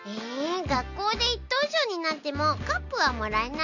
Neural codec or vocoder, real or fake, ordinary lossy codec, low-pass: none; real; none; 7.2 kHz